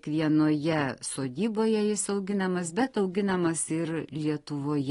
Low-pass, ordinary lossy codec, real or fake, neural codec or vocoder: 10.8 kHz; AAC, 32 kbps; real; none